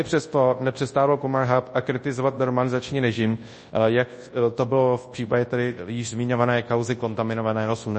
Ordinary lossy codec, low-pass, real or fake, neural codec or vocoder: MP3, 32 kbps; 10.8 kHz; fake; codec, 24 kHz, 0.9 kbps, WavTokenizer, large speech release